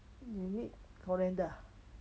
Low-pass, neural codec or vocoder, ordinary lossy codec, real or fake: none; none; none; real